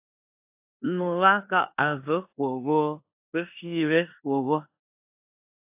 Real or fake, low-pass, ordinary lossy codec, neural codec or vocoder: fake; 3.6 kHz; AAC, 32 kbps; codec, 16 kHz in and 24 kHz out, 0.9 kbps, LongCat-Audio-Codec, four codebook decoder